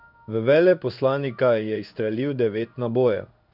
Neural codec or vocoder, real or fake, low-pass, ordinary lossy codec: codec, 16 kHz in and 24 kHz out, 1 kbps, XY-Tokenizer; fake; 5.4 kHz; none